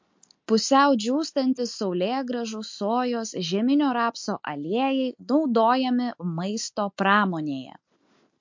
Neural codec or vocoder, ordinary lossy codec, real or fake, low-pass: none; MP3, 48 kbps; real; 7.2 kHz